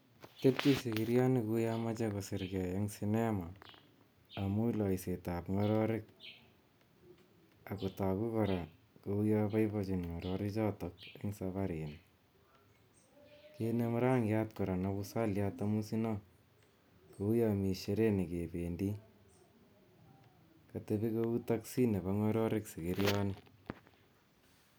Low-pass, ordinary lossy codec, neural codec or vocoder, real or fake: none; none; none; real